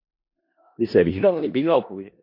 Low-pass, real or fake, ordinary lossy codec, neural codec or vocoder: 5.4 kHz; fake; MP3, 32 kbps; codec, 16 kHz in and 24 kHz out, 0.4 kbps, LongCat-Audio-Codec, four codebook decoder